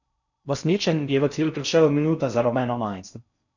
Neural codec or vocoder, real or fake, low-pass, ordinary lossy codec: codec, 16 kHz in and 24 kHz out, 0.6 kbps, FocalCodec, streaming, 4096 codes; fake; 7.2 kHz; none